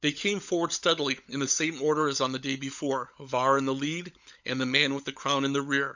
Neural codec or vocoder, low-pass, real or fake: codec, 16 kHz, 16 kbps, FunCodec, trained on LibriTTS, 50 frames a second; 7.2 kHz; fake